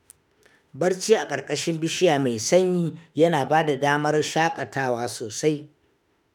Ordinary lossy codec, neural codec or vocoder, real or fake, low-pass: none; autoencoder, 48 kHz, 32 numbers a frame, DAC-VAE, trained on Japanese speech; fake; 19.8 kHz